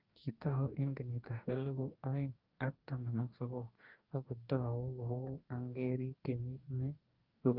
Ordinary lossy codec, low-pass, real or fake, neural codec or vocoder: Opus, 24 kbps; 5.4 kHz; fake; codec, 44.1 kHz, 2.6 kbps, DAC